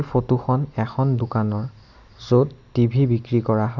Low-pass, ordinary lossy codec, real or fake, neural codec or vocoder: 7.2 kHz; none; real; none